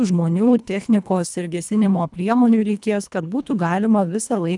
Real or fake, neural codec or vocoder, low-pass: fake; codec, 24 kHz, 1.5 kbps, HILCodec; 10.8 kHz